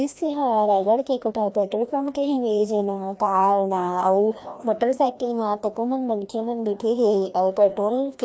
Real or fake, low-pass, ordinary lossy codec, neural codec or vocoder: fake; none; none; codec, 16 kHz, 1 kbps, FreqCodec, larger model